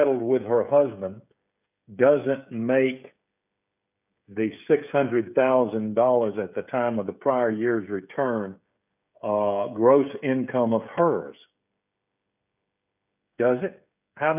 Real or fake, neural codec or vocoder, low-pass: fake; codec, 16 kHz, 16 kbps, FreqCodec, smaller model; 3.6 kHz